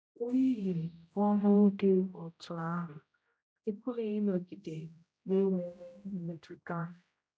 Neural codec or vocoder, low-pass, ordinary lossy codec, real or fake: codec, 16 kHz, 0.5 kbps, X-Codec, HuBERT features, trained on general audio; none; none; fake